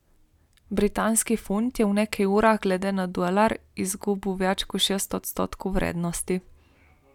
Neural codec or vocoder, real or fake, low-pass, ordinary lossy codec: none; real; 19.8 kHz; none